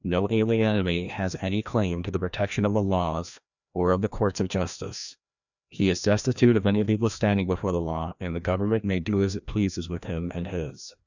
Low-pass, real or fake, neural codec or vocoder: 7.2 kHz; fake; codec, 16 kHz, 1 kbps, FreqCodec, larger model